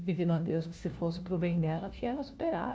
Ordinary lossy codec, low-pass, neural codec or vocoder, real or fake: none; none; codec, 16 kHz, 1 kbps, FunCodec, trained on LibriTTS, 50 frames a second; fake